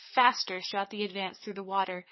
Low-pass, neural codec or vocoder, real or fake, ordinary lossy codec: 7.2 kHz; codec, 44.1 kHz, 7.8 kbps, DAC; fake; MP3, 24 kbps